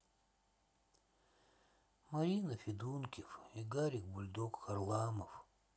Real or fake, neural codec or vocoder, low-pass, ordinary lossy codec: real; none; none; none